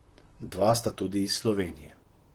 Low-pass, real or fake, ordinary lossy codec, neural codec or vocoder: 19.8 kHz; fake; Opus, 16 kbps; vocoder, 44.1 kHz, 128 mel bands, Pupu-Vocoder